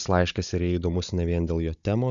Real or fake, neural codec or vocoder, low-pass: fake; codec, 16 kHz, 16 kbps, FunCodec, trained on Chinese and English, 50 frames a second; 7.2 kHz